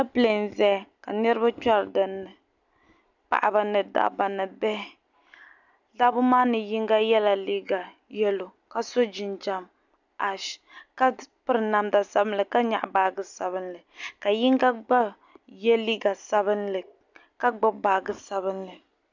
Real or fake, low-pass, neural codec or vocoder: real; 7.2 kHz; none